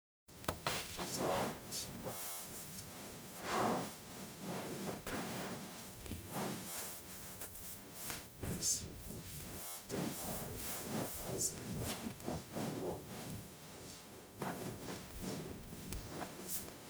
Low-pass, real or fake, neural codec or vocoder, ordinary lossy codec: none; fake; codec, 44.1 kHz, 0.9 kbps, DAC; none